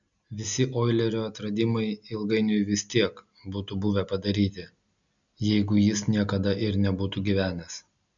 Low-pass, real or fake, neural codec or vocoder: 7.2 kHz; real; none